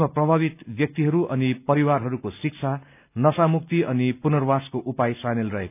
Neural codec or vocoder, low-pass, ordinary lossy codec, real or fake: none; 3.6 kHz; none; real